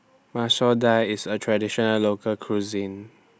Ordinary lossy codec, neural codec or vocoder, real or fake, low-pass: none; none; real; none